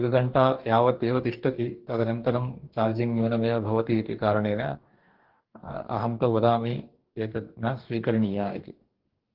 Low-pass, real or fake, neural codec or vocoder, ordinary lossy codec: 5.4 kHz; fake; codec, 44.1 kHz, 2.6 kbps, DAC; Opus, 16 kbps